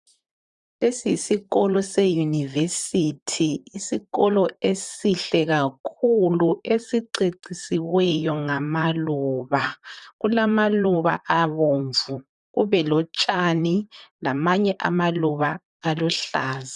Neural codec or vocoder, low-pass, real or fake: vocoder, 44.1 kHz, 128 mel bands, Pupu-Vocoder; 10.8 kHz; fake